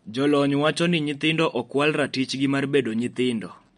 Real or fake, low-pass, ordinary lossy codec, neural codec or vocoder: real; 19.8 kHz; MP3, 48 kbps; none